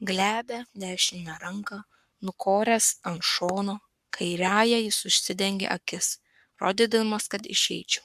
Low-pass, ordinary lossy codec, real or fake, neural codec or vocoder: 14.4 kHz; MP3, 96 kbps; fake; codec, 44.1 kHz, 7.8 kbps, Pupu-Codec